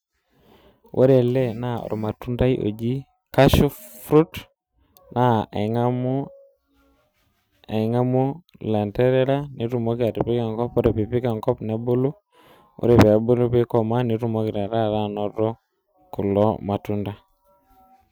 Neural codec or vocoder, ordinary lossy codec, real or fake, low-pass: none; none; real; none